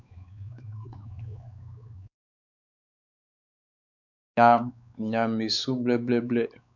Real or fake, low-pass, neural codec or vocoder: fake; 7.2 kHz; codec, 16 kHz, 4 kbps, X-Codec, WavLM features, trained on Multilingual LibriSpeech